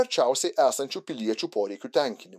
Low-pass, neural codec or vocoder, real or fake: 14.4 kHz; autoencoder, 48 kHz, 128 numbers a frame, DAC-VAE, trained on Japanese speech; fake